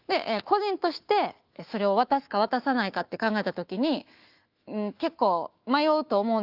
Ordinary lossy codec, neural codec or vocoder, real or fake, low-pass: Opus, 32 kbps; autoencoder, 48 kHz, 128 numbers a frame, DAC-VAE, trained on Japanese speech; fake; 5.4 kHz